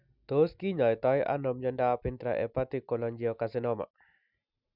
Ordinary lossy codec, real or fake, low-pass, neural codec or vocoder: none; real; 5.4 kHz; none